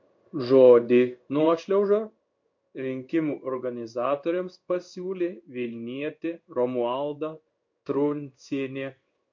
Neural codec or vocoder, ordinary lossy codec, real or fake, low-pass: codec, 16 kHz in and 24 kHz out, 1 kbps, XY-Tokenizer; MP3, 48 kbps; fake; 7.2 kHz